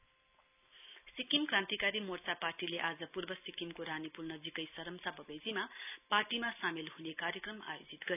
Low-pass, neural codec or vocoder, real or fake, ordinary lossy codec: 3.6 kHz; none; real; none